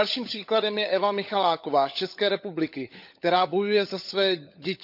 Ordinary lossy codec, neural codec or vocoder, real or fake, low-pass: none; codec, 16 kHz, 16 kbps, FunCodec, trained on LibriTTS, 50 frames a second; fake; 5.4 kHz